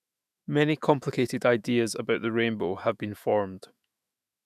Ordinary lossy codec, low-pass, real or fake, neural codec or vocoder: none; 14.4 kHz; fake; autoencoder, 48 kHz, 128 numbers a frame, DAC-VAE, trained on Japanese speech